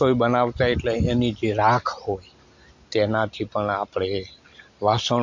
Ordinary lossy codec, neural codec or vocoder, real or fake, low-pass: MP3, 48 kbps; none; real; 7.2 kHz